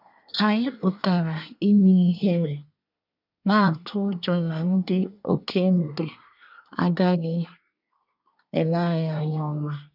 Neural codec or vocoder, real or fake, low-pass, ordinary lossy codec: codec, 24 kHz, 1 kbps, SNAC; fake; 5.4 kHz; none